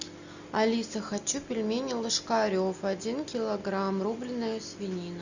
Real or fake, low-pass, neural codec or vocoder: real; 7.2 kHz; none